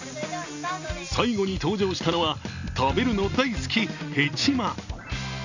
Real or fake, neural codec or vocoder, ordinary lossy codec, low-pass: real; none; none; 7.2 kHz